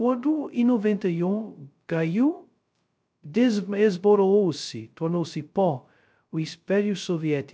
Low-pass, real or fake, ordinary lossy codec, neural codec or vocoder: none; fake; none; codec, 16 kHz, 0.2 kbps, FocalCodec